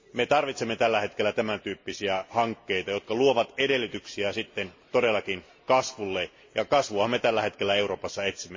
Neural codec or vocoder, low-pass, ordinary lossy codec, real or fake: none; 7.2 kHz; MP3, 32 kbps; real